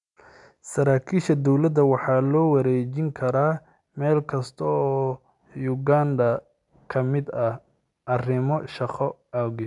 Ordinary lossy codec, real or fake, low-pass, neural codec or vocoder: none; real; 10.8 kHz; none